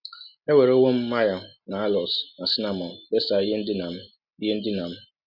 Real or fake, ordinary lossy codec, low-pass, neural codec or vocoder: real; none; 5.4 kHz; none